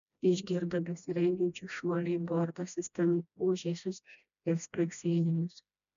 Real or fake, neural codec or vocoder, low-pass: fake; codec, 16 kHz, 1 kbps, FreqCodec, smaller model; 7.2 kHz